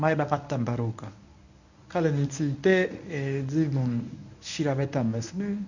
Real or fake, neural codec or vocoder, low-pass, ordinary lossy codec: fake; codec, 24 kHz, 0.9 kbps, WavTokenizer, medium speech release version 1; 7.2 kHz; none